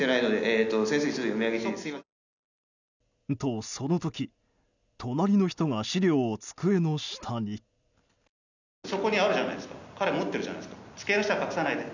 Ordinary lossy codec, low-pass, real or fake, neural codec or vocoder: none; 7.2 kHz; real; none